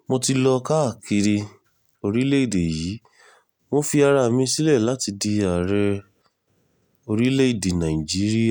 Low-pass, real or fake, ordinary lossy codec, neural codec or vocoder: none; real; none; none